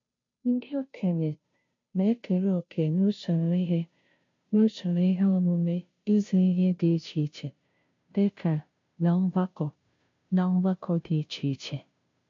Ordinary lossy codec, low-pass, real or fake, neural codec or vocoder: MP3, 48 kbps; 7.2 kHz; fake; codec, 16 kHz, 0.5 kbps, FunCodec, trained on Chinese and English, 25 frames a second